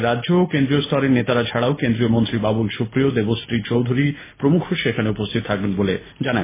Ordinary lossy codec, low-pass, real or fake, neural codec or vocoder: MP3, 16 kbps; 3.6 kHz; real; none